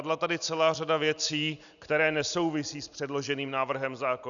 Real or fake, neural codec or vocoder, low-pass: real; none; 7.2 kHz